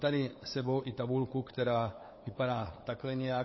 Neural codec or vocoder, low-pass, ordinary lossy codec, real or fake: codec, 16 kHz, 8 kbps, FunCodec, trained on LibriTTS, 25 frames a second; 7.2 kHz; MP3, 24 kbps; fake